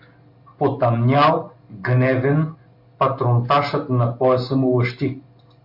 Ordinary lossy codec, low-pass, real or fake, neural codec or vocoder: MP3, 48 kbps; 5.4 kHz; real; none